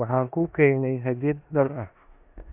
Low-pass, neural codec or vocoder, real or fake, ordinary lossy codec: 3.6 kHz; codec, 16 kHz in and 24 kHz out, 0.9 kbps, LongCat-Audio-Codec, four codebook decoder; fake; AAC, 32 kbps